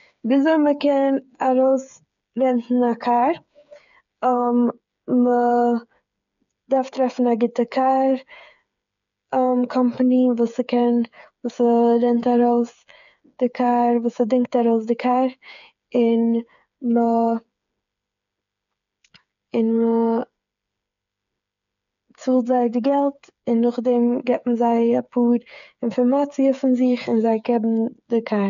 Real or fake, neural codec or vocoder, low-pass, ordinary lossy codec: fake; codec, 16 kHz, 8 kbps, FreqCodec, smaller model; 7.2 kHz; none